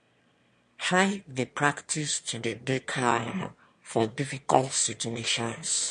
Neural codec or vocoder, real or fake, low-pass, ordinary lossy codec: autoencoder, 22.05 kHz, a latent of 192 numbers a frame, VITS, trained on one speaker; fake; 9.9 kHz; MP3, 48 kbps